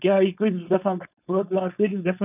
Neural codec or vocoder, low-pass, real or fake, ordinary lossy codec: codec, 16 kHz, 4.8 kbps, FACodec; 3.6 kHz; fake; none